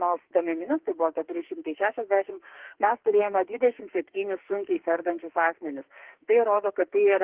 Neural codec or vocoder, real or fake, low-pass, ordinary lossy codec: codec, 44.1 kHz, 2.6 kbps, SNAC; fake; 3.6 kHz; Opus, 16 kbps